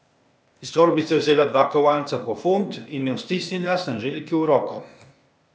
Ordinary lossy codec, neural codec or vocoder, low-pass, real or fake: none; codec, 16 kHz, 0.8 kbps, ZipCodec; none; fake